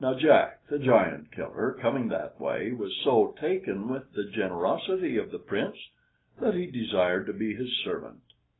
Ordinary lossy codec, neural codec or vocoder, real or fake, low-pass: AAC, 16 kbps; none; real; 7.2 kHz